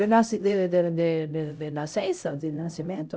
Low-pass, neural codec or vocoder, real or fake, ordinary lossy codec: none; codec, 16 kHz, 0.5 kbps, X-Codec, HuBERT features, trained on LibriSpeech; fake; none